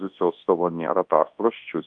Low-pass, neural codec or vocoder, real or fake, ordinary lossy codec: 9.9 kHz; codec, 24 kHz, 0.9 kbps, WavTokenizer, large speech release; fake; Opus, 32 kbps